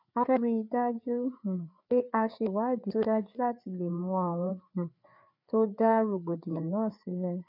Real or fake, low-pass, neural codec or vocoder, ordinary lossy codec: fake; 5.4 kHz; codec, 16 kHz, 4 kbps, FreqCodec, larger model; none